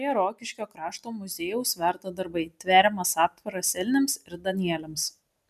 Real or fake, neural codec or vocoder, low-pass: real; none; 14.4 kHz